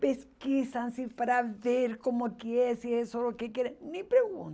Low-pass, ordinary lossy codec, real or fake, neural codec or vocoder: none; none; real; none